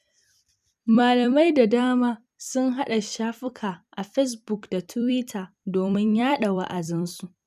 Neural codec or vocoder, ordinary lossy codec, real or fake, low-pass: vocoder, 44.1 kHz, 128 mel bands every 256 samples, BigVGAN v2; none; fake; 14.4 kHz